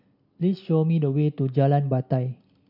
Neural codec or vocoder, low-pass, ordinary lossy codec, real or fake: none; 5.4 kHz; none; real